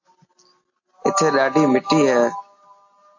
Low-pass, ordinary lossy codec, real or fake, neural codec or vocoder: 7.2 kHz; AAC, 48 kbps; real; none